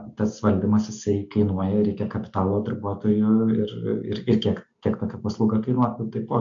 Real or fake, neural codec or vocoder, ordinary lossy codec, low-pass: real; none; MP3, 64 kbps; 7.2 kHz